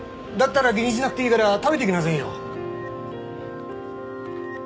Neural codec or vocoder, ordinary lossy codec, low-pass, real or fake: none; none; none; real